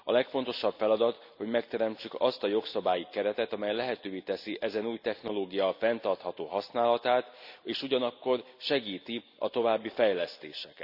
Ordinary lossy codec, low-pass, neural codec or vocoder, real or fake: none; 5.4 kHz; none; real